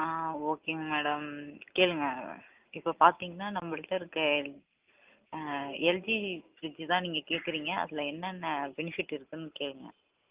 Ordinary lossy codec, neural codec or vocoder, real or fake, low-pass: Opus, 32 kbps; none; real; 3.6 kHz